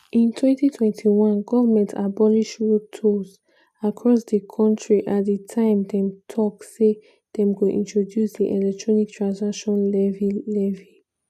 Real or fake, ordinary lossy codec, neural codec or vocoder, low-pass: real; none; none; 14.4 kHz